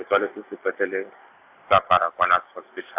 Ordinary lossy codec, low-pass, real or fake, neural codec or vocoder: AAC, 32 kbps; 3.6 kHz; real; none